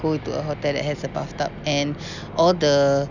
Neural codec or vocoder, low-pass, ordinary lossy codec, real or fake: none; 7.2 kHz; none; real